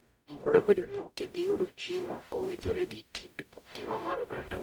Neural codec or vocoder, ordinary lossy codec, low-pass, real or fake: codec, 44.1 kHz, 0.9 kbps, DAC; none; none; fake